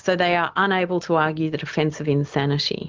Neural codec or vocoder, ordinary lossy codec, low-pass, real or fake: none; Opus, 32 kbps; 7.2 kHz; real